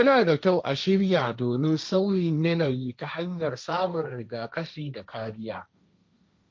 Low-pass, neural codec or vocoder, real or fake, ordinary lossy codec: none; codec, 16 kHz, 1.1 kbps, Voila-Tokenizer; fake; none